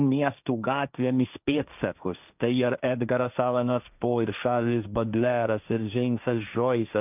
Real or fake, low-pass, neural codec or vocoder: fake; 3.6 kHz; codec, 16 kHz, 1.1 kbps, Voila-Tokenizer